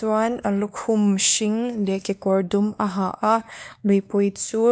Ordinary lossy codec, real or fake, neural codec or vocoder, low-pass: none; fake; codec, 16 kHz, 2 kbps, X-Codec, WavLM features, trained on Multilingual LibriSpeech; none